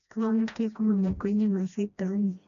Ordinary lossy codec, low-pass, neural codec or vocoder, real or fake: none; 7.2 kHz; codec, 16 kHz, 1 kbps, FreqCodec, smaller model; fake